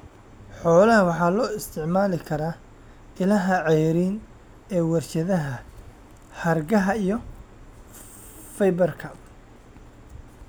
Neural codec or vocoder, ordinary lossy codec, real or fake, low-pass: none; none; real; none